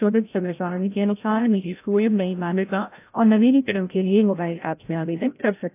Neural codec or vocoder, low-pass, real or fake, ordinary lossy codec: codec, 16 kHz, 0.5 kbps, FreqCodec, larger model; 3.6 kHz; fake; AAC, 24 kbps